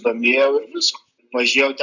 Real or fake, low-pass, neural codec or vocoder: real; 7.2 kHz; none